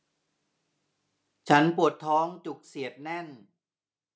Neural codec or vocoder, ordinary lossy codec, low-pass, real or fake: none; none; none; real